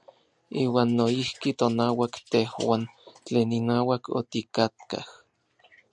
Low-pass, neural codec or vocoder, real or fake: 10.8 kHz; none; real